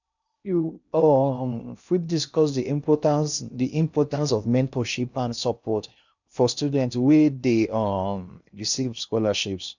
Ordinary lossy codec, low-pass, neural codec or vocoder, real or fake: none; 7.2 kHz; codec, 16 kHz in and 24 kHz out, 0.6 kbps, FocalCodec, streaming, 2048 codes; fake